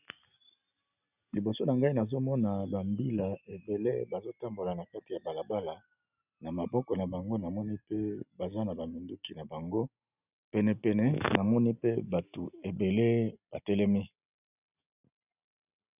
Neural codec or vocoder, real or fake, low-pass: none; real; 3.6 kHz